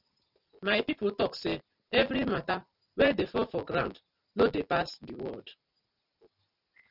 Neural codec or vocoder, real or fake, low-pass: none; real; 5.4 kHz